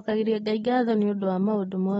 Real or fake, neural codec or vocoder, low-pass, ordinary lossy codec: real; none; 14.4 kHz; AAC, 24 kbps